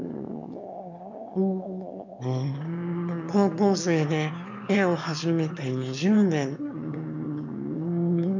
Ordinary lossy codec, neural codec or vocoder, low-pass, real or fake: none; autoencoder, 22.05 kHz, a latent of 192 numbers a frame, VITS, trained on one speaker; 7.2 kHz; fake